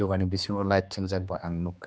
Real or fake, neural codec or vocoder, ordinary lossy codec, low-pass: fake; codec, 16 kHz, 2 kbps, X-Codec, HuBERT features, trained on general audio; none; none